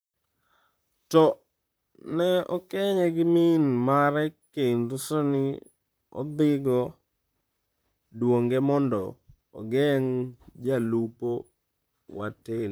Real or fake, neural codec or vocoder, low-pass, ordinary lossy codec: fake; vocoder, 44.1 kHz, 128 mel bands, Pupu-Vocoder; none; none